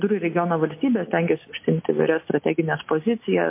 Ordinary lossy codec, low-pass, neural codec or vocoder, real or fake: MP3, 24 kbps; 3.6 kHz; none; real